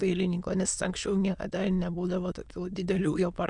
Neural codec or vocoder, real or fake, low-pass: autoencoder, 22.05 kHz, a latent of 192 numbers a frame, VITS, trained on many speakers; fake; 9.9 kHz